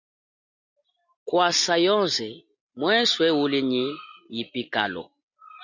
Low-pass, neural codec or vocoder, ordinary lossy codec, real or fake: 7.2 kHz; none; Opus, 64 kbps; real